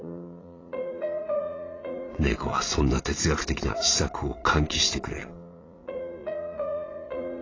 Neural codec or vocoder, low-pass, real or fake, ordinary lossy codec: vocoder, 22.05 kHz, 80 mel bands, Vocos; 7.2 kHz; fake; AAC, 32 kbps